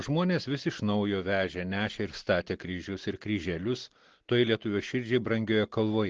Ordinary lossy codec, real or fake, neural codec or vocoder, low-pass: Opus, 16 kbps; real; none; 7.2 kHz